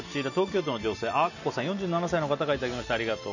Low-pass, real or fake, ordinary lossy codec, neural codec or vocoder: 7.2 kHz; real; none; none